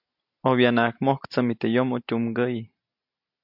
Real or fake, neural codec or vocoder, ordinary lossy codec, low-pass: real; none; MP3, 48 kbps; 5.4 kHz